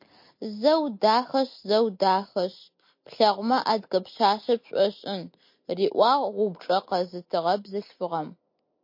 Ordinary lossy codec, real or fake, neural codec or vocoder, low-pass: MP3, 32 kbps; real; none; 5.4 kHz